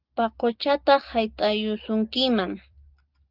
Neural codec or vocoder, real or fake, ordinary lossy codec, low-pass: none; real; Opus, 24 kbps; 5.4 kHz